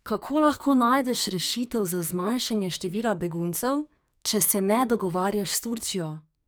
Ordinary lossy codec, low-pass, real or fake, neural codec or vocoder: none; none; fake; codec, 44.1 kHz, 2.6 kbps, SNAC